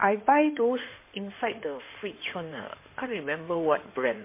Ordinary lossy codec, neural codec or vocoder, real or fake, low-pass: MP3, 24 kbps; codec, 16 kHz in and 24 kHz out, 2.2 kbps, FireRedTTS-2 codec; fake; 3.6 kHz